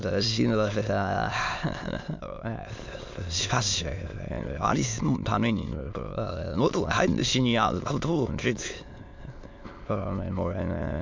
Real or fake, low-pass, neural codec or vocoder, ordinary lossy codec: fake; 7.2 kHz; autoencoder, 22.05 kHz, a latent of 192 numbers a frame, VITS, trained on many speakers; MP3, 64 kbps